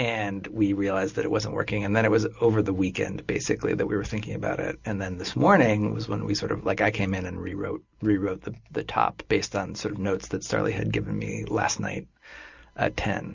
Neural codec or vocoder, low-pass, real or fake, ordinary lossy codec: none; 7.2 kHz; real; Opus, 64 kbps